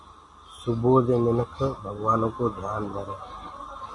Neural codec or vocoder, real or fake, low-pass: none; real; 10.8 kHz